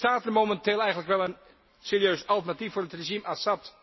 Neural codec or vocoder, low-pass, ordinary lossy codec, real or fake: none; 7.2 kHz; MP3, 24 kbps; real